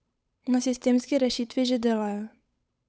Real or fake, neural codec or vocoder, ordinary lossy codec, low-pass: fake; codec, 16 kHz, 8 kbps, FunCodec, trained on Chinese and English, 25 frames a second; none; none